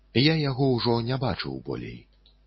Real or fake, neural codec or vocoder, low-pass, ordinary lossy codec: real; none; 7.2 kHz; MP3, 24 kbps